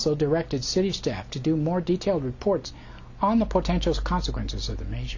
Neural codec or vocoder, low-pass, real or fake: none; 7.2 kHz; real